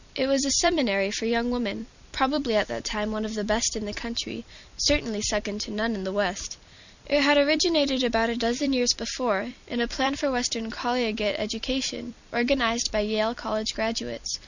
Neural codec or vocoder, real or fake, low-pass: vocoder, 44.1 kHz, 128 mel bands every 256 samples, BigVGAN v2; fake; 7.2 kHz